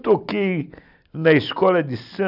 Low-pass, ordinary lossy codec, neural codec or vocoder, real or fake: 5.4 kHz; none; none; real